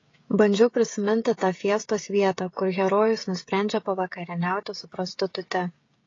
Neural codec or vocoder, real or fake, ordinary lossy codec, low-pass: codec, 16 kHz, 16 kbps, FreqCodec, smaller model; fake; AAC, 32 kbps; 7.2 kHz